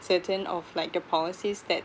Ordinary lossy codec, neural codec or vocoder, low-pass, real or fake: none; none; none; real